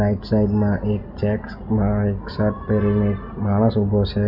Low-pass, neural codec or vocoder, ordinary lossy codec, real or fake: 5.4 kHz; none; none; real